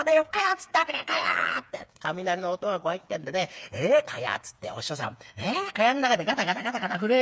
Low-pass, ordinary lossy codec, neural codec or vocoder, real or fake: none; none; codec, 16 kHz, 4 kbps, FreqCodec, smaller model; fake